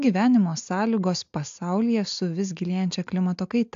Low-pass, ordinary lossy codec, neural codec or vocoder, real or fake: 7.2 kHz; AAC, 64 kbps; none; real